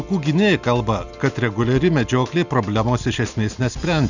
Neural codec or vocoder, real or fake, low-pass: none; real; 7.2 kHz